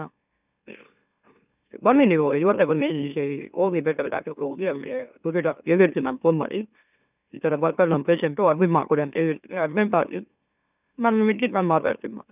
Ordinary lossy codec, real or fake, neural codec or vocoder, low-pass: none; fake; autoencoder, 44.1 kHz, a latent of 192 numbers a frame, MeloTTS; 3.6 kHz